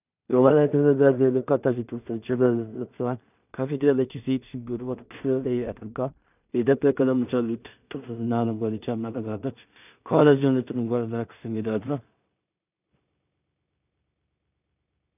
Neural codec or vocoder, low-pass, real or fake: codec, 16 kHz in and 24 kHz out, 0.4 kbps, LongCat-Audio-Codec, two codebook decoder; 3.6 kHz; fake